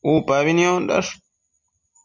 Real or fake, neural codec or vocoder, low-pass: real; none; 7.2 kHz